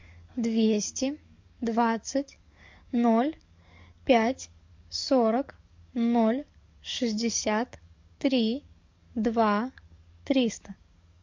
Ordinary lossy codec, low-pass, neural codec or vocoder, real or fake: MP3, 48 kbps; 7.2 kHz; codec, 44.1 kHz, 7.8 kbps, DAC; fake